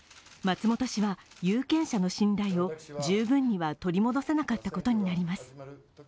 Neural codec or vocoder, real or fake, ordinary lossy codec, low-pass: none; real; none; none